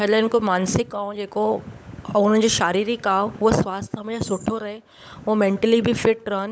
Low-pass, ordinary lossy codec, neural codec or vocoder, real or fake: none; none; codec, 16 kHz, 16 kbps, FunCodec, trained on LibriTTS, 50 frames a second; fake